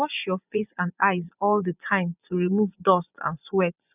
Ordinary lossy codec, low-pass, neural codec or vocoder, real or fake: none; 3.6 kHz; vocoder, 24 kHz, 100 mel bands, Vocos; fake